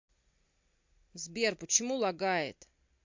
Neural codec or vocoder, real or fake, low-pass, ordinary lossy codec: none; real; 7.2 kHz; MP3, 48 kbps